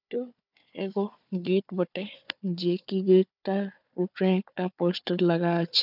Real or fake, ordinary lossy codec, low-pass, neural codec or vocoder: fake; none; 5.4 kHz; codec, 16 kHz, 4 kbps, FunCodec, trained on Chinese and English, 50 frames a second